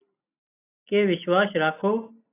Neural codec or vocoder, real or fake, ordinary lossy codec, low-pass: none; real; AAC, 16 kbps; 3.6 kHz